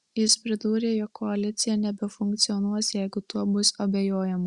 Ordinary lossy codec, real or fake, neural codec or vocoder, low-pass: Opus, 64 kbps; real; none; 10.8 kHz